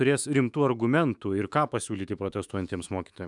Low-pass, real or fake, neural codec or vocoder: 10.8 kHz; fake; autoencoder, 48 kHz, 128 numbers a frame, DAC-VAE, trained on Japanese speech